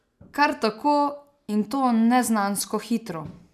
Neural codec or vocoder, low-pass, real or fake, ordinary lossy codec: none; 14.4 kHz; real; none